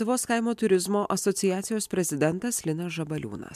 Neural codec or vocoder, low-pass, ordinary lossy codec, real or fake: none; 14.4 kHz; MP3, 96 kbps; real